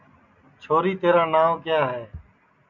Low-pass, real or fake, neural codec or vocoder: 7.2 kHz; real; none